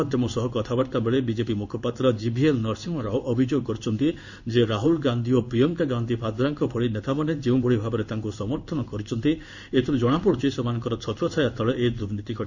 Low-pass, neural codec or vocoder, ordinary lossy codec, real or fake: 7.2 kHz; codec, 16 kHz in and 24 kHz out, 1 kbps, XY-Tokenizer; none; fake